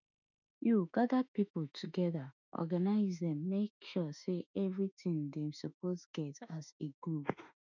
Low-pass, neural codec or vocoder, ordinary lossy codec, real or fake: 7.2 kHz; autoencoder, 48 kHz, 32 numbers a frame, DAC-VAE, trained on Japanese speech; none; fake